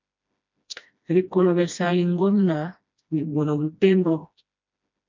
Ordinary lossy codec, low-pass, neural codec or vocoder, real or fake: MP3, 64 kbps; 7.2 kHz; codec, 16 kHz, 1 kbps, FreqCodec, smaller model; fake